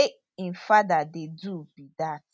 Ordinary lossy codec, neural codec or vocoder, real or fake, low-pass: none; none; real; none